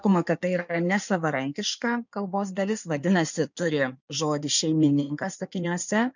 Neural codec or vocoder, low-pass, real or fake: codec, 16 kHz in and 24 kHz out, 2.2 kbps, FireRedTTS-2 codec; 7.2 kHz; fake